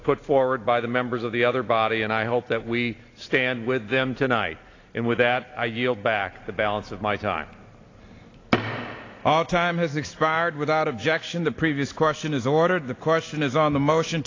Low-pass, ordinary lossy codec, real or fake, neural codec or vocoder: 7.2 kHz; AAC, 32 kbps; real; none